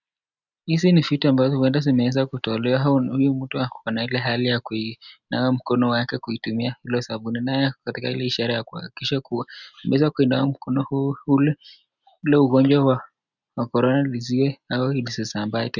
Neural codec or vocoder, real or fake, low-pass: none; real; 7.2 kHz